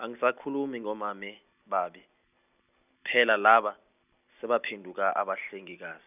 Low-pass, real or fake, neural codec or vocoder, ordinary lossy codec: 3.6 kHz; real; none; none